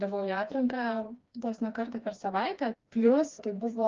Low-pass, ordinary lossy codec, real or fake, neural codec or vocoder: 7.2 kHz; Opus, 32 kbps; fake; codec, 16 kHz, 2 kbps, FreqCodec, smaller model